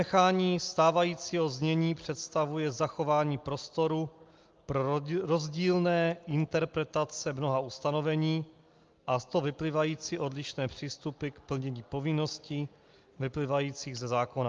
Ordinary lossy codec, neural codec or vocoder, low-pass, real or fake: Opus, 32 kbps; none; 7.2 kHz; real